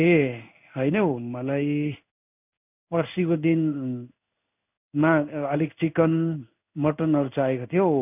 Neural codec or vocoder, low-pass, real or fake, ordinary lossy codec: codec, 16 kHz in and 24 kHz out, 1 kbps, XY-Tokenizer; 3.6 kHz; fake; none